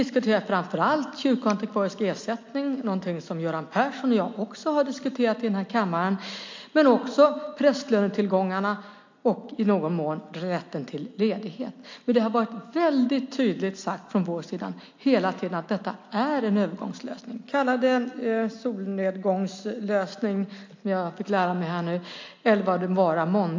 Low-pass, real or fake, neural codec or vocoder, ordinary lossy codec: 7.2 kHz; real; none; MP3, 48 kbps